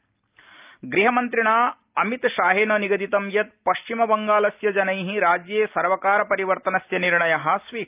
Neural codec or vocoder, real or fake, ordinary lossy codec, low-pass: none; real; Opus, 24 kbps; 3.6 kHz